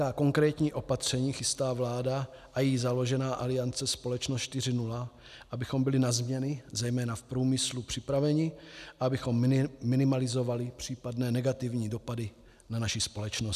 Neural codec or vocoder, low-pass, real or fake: none; 14.4 kHz; real